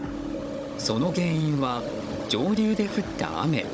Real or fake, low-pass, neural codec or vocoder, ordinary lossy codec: fake; none; codec, 16 kHz, 16 kbps, FunCodec, trained on Chinese and English, 50 frames a second; none